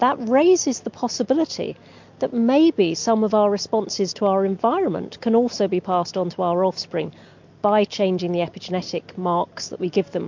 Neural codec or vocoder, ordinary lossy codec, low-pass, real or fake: none; MP3, 48 kbps; 7.2 kHz; real